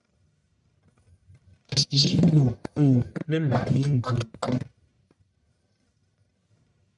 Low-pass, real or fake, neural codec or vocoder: 10.8 kHz; fake; codec, 44.1 kHz, 1.7 kbps, Pupu-Codec